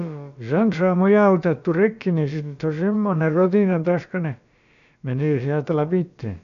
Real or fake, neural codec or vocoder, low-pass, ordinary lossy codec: fake; codec, 16 kHz, about 1 kbps, DyCAST, with the encoder's durations; 7.2 kHz; none